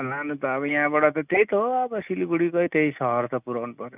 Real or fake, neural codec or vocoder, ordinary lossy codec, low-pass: real; none; none; 3.6 kHz